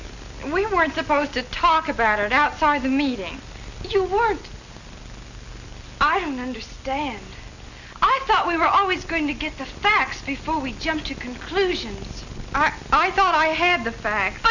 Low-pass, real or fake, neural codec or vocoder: 7.2 kHz; real; none